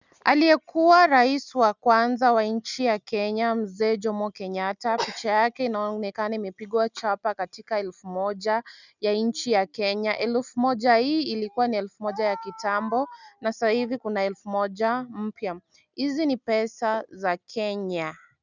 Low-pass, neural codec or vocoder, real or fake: 7.2 kHz; none; real